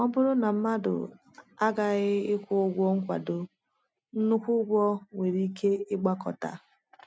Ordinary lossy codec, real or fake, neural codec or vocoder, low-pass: none; real; none; none